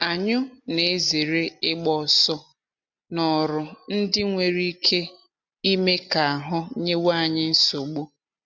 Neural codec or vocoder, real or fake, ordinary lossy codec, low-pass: none; real; none; 7.2 kHz